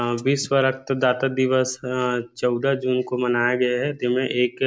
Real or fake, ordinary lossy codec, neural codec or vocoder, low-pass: real; none; none; none